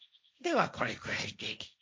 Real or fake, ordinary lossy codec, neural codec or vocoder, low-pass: fake; none; codec, 16 kHz, 1.1 kbps, Voila-Tokenizer; none